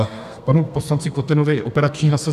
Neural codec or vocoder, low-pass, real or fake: codec, 32 kHz, 1.9 kbps, SNAC; 14.4 kHz; fake